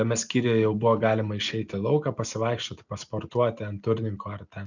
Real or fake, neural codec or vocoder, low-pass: real; none; 7.2 kHz